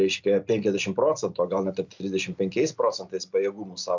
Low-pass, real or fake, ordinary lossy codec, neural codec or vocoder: 7.2 kHz; real; MP3, 64 kbps; none